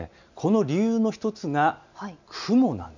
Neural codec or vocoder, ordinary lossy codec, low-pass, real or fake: none; none; 7.2 kHz; real